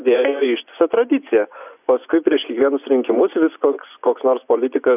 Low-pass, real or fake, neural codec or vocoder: 3.6 kHz; fake; vocoder, 24 kHz, 100 mel bands, Vocos